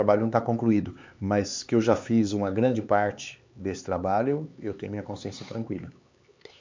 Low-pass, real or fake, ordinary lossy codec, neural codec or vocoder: 7.2 kHz; fake; MP3, 64 kbps; codec, 16 kHz, 4 kbps, X-Codec, HuBERT features, trained on LibriSpeech